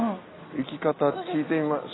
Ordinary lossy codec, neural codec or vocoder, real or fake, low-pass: AAC, 16 kbps; none; real; 7.2 kHz